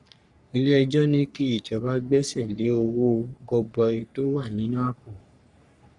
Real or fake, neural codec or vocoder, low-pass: fake; codec, 44.1 kHz, 3.4 kbps, Pupu-Codec; 10.8 kHz